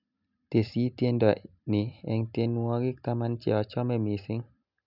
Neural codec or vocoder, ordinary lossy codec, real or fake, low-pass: none; none; real; 5.4 kHz